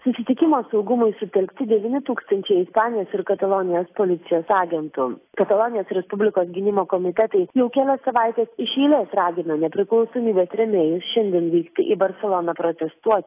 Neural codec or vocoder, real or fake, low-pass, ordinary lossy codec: none; real; 3.6 kHz; AAC, 24 kbps